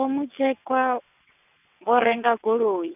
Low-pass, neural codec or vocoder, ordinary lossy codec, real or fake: 3.6 kHz; vocoder, 22.05 kHz, 80 mel bands, WaveNeXt; none; fake